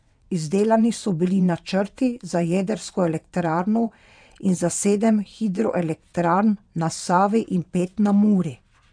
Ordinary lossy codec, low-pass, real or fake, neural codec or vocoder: none; 9.9 kHz; fake; vocoder, 22.05 kHz, 80 mel bands, WaveNeXt